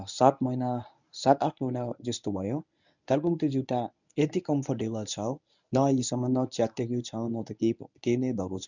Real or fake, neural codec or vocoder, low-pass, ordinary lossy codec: fake; codec, 24 kHz, 0.9 kbps, WavTokenizer, medium speech release version 1; 7.2 kHz; none